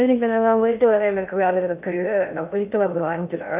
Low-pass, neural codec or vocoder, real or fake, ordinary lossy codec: 3.6 kHz; codec, 16 kHz in and 24 kHz out, 0.6 kbps, FocalCodec, streaming, 2048 codes; fake; none